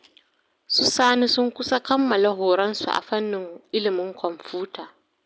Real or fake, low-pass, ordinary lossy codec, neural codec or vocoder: real; none; none; none